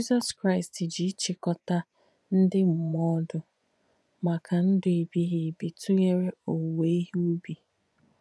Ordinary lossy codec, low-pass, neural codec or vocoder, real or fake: none; none; vocoder, 24 kHz, 100 mel bands, Vocos; fake